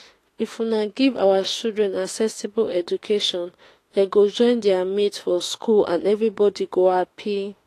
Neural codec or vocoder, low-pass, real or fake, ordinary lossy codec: autoencoder, 48 kHz, 32 numbers a frame, DAC-VAE, trained on Japanese speech; 14.4 kHz; fake; AAC, 48 kbps